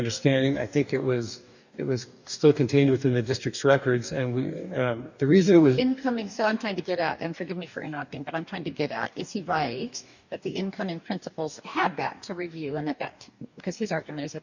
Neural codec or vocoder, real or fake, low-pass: codec, 44.1 kHz, 2.6 kbps, DAC; fake; 7.2 kHz